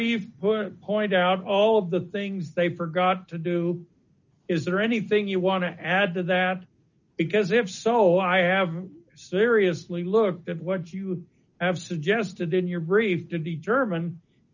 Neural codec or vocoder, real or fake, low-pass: none; real; 7.2 kHz